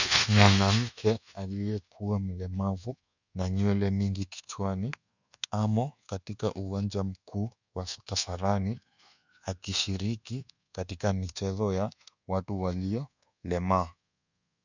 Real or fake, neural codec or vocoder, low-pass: fake; codec, 24 kHz, 1.2 kbps, DualCodec; 7.2 kHz